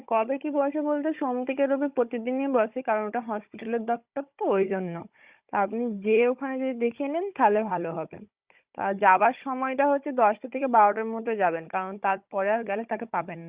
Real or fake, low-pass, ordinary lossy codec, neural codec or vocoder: fake; 3.6 kHz; Opus, 64 kbps; codec, 16 kHz, 16 kbps, FunCodec, trained on Chinese and English, 50 frames a second